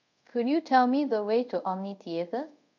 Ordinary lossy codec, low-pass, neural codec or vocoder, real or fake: MP3, 64 kbps; 7.2 kHz; codec, 24 kHz, 0.5 kbps, DualCodec; fake